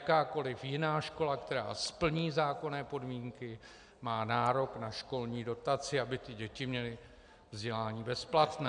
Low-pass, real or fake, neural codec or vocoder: 9.9 kHz; real; none